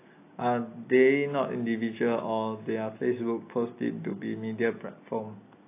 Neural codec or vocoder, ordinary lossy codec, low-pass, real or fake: none; AAC, 24 kbps; 3.6 kHz; real